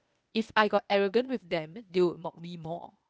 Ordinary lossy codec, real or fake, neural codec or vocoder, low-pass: none; fake; codec, 16 kHz, 0.8 kbps, ZipCodec; none